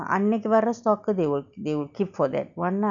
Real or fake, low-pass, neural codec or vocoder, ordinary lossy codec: real; 7.2 kHz; none; none